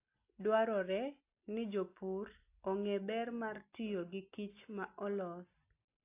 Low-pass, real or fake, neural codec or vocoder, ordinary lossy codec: 3.6 kHz; real; none; AAC, 24 kbps